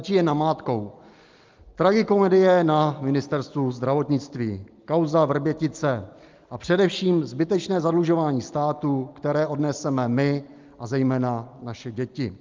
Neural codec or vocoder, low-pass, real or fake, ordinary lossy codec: none; 7.2 kHz; real; Opus, 32 kbps